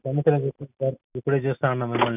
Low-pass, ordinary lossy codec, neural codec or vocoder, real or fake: 3.6 kHz; none; none; real